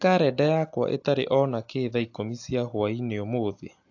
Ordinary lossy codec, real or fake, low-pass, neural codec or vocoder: AAC, 48 kbps; real; 7.2 kHz; none